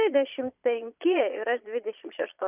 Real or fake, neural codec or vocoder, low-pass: fake; vocoder, 22.05 kHz, 80 mel bands, Vocos; 3.6 kHz